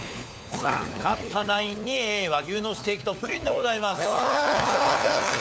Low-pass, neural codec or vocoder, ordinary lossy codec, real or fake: none; codec, 16 kHz, 4 kbps, FunCodec, trained on LibriTTS, 50 frames a second; none; fake